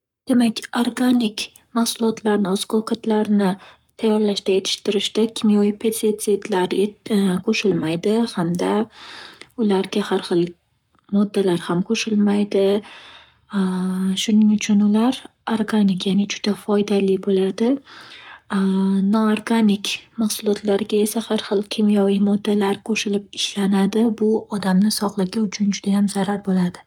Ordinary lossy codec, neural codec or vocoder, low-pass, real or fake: none; codec, 44.1 kHz, 7.8 kbps, Pupu-Codec; 19.8 kHz; fake